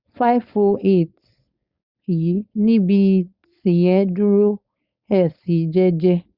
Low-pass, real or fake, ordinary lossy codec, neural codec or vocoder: 5.4 kHz; fake; Opus, 64 kbps; codec, 16 kHz, 4.8 kbps, FACodec